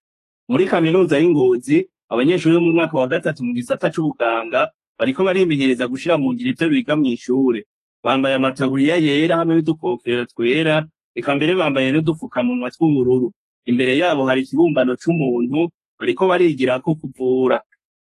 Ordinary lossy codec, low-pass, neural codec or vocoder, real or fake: AAC, 48 kbps; 14.4 kHz; codec, 32 kHz, 1.9 kbps, SNAC; fake